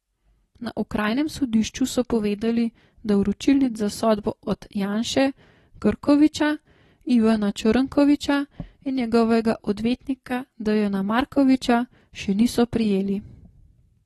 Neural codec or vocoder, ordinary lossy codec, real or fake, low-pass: none; AAC, 32 kbps; real; 19.8 kHz